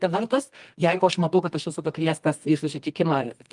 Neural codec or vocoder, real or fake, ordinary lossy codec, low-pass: codec, 24 kHz, 0.9 kbps, WavTokenizer, medium music audio release; fake; Opus, 32 kbps; 10.8 kHz